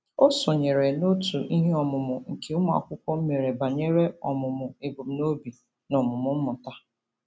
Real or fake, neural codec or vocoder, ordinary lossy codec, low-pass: real; none; none; none